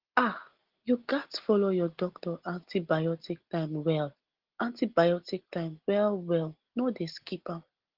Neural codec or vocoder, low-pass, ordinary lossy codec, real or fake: none; 5.4 kHz; Opus, 16 kbps; real